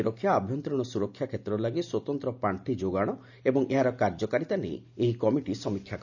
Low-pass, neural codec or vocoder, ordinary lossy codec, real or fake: 7.2 kHz; none; none; real